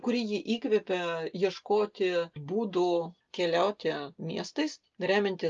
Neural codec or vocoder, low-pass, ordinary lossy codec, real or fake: none; 7.2 kHz; Opus, 32 kbps; real